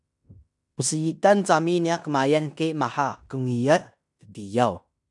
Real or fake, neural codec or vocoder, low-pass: fake; codec, 16 kHz in and 24 kHz out, 0.9 kbps, LongCat-Audio-Codec, fine tuned four codebook decoder; 10.8 kHz